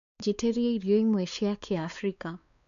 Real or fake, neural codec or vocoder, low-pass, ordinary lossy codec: fake; codec, 16 kHz, 4 kbps, X-Codec, WavLM features, trained on Multilingual LibriSpeech; 7.2 kHz; none